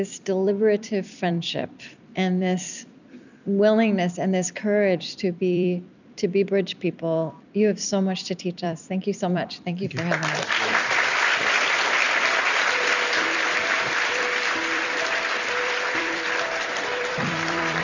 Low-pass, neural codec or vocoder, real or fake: 7.2 kHz; vocoder, 44.1 kHz, 128 mel bands every 256 samples, BigVGAN v2; fake